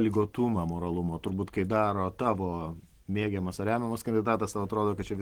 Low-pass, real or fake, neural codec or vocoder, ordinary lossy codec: 19.8 kHz; real; none; Opus, 16 kbps